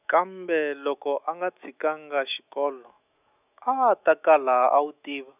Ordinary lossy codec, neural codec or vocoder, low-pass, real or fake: none; autoencoder, 48 kHz, 128 numbers a frame, DAC-VAE, trained on Japanese speech; 3.6 kHz; fake